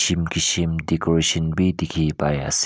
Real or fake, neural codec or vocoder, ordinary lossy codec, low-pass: real; none; none; none